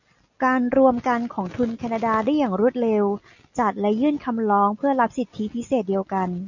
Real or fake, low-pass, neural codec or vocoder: real; 7.2 kHz; none